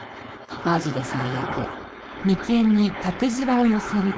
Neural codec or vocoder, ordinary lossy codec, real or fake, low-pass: codec, 16 kHz, 4.8 kbps, FACodec; none; fake; none